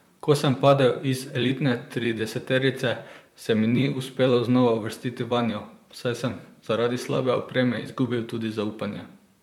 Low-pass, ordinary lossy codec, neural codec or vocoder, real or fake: 19.8 kHz; MP3, 96 kbps; vocoder, 44.1 kHz, 128 mel bands, Pupu-Vocoder; fake